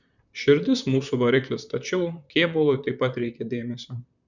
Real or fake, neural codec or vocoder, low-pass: fake; vocoder, 44.1 kHz, 128 mel bands, Pupu-Vocoder; 7.2 kHz